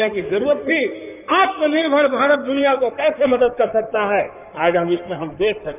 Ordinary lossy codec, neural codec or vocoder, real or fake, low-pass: none; codec, 16 kHz in and 24 kHz out, 2.2 kbps, FireRedTTS-2 codec; fake; 3.6 kHz